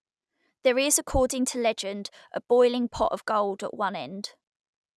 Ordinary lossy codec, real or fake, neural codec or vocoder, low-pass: none; real; none; none